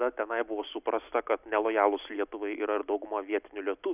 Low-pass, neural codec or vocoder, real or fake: 3.6 kHz; none; real